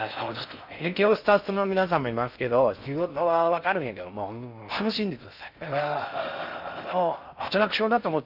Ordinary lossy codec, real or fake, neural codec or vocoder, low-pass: none; fake; codec, 16 kHz in and 24 kHz out, 0.6 kbps, FocalCodec, streaming, 4096 codes; 5.4 kHz